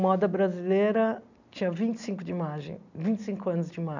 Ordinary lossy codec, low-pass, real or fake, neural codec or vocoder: none; 7.2 kHz; real; none